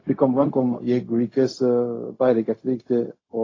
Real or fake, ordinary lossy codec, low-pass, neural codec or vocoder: fake; AAC, 32 kbps; 7.2 kHz; codec, 16 kHz, 0.4 kbps, LongCat-Audio-Codec